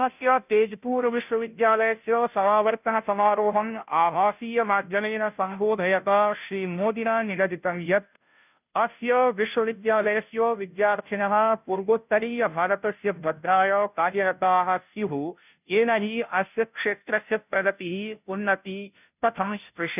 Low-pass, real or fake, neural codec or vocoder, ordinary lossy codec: 3.6 kHz; fake; codec, 16 kHz, 0.5 kbps, FunCodec, trained on Chinese and English, 25 frames a second; none